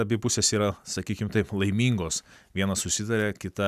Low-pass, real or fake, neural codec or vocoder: 14.4 kHz; real; none